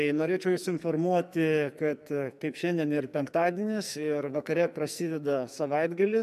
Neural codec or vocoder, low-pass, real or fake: codec, 44.1 kHz, 2.6 kbps, SNAC; 14.4 kHz; fake